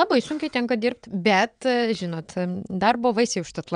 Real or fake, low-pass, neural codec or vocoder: fake; 9.9 kHz; vocoder, 22.05 kHz, 80 mel bands, WaveNeXt